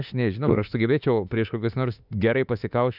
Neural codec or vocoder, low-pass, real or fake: autoencoder, 48 kHz, 32 numbers a frame, DAC-VAE, trained on Japanese speech; 5.4 kHz; fake